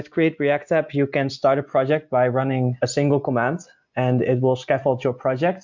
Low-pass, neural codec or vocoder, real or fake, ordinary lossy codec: 7.2 kHz; none; real; MP3, 64 kbps